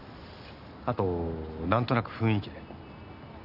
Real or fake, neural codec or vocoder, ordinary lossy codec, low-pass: real; none; none; 5.4 kHz